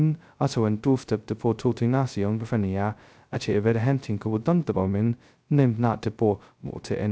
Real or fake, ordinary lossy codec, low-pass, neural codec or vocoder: fake; none; none; codec, 16 kHz, 0.2 kbps, FocalCodec